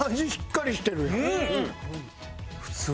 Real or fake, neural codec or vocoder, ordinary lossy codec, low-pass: real; none; none; none